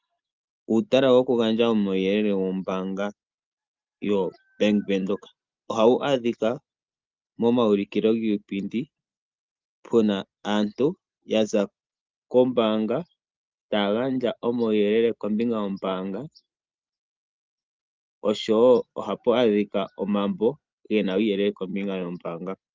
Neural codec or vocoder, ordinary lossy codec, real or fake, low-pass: none; Opus, 32 kbps; real; 7.2 kHz